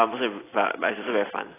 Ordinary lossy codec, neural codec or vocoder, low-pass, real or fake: AAC, 16 kbps; none; 3.6 kHz; real